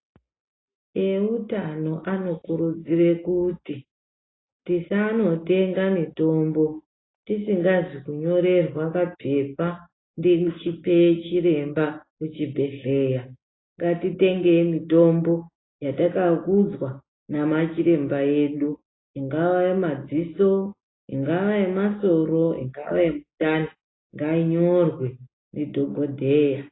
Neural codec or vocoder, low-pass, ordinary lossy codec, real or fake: none; 7.2 kHz; AAC, 16 kbps; real